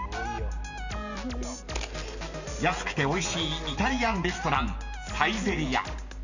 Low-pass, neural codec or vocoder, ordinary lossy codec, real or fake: 7.2 kHz; none; none; real